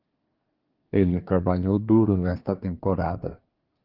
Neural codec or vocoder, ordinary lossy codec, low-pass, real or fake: codec, 24 kHz, 1 kbps, SNAC; Opus, 24 kbps; 5.4 kHz; fake